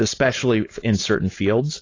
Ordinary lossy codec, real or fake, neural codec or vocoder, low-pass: AAC, 32 kbps; fake; codec, 16 kHz, 4.8 kbps, FACodec; 7.2 kHz